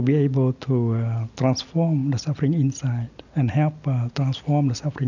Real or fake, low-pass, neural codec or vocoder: real; 7.2 kHz; none